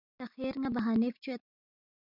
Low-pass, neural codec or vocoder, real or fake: 5.4 kHz; none; real